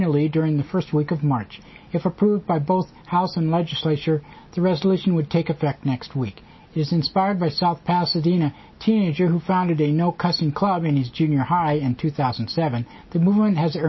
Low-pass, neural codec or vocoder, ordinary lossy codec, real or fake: 7.2 kHz; none; MP3, 24 kbps; real